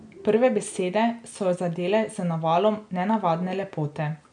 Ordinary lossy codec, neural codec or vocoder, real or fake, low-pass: none; none; real; 9.9 kHz